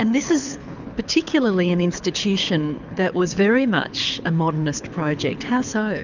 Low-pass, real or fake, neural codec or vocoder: 7.2 kHz; fake; codec, 24 kHz, 6 kbps, HILCodec